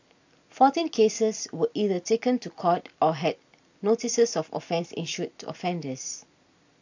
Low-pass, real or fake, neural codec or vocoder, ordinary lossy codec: 7.2 kHz; real; none; AAC, 48 kbps